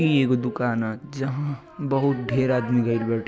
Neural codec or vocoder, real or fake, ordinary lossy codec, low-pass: none; real; none; none